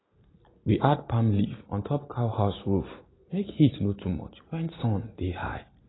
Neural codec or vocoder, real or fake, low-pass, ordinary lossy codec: none; real; 7.2 kHz; AAC, 16 kbps